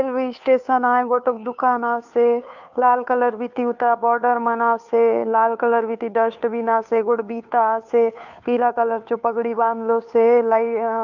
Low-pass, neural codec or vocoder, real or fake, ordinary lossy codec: 7.2 kHz; codec, 16 kHz, 2 kbps, FunCodec, trained on Chinese and English, 25 frames a second; fake; none